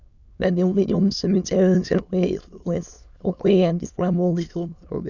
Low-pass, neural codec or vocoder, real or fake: 7.2 kHz; autoencoder, 22.05 kHz, a latent of 192 numbers a frame, VITS, trained on many speakers; fake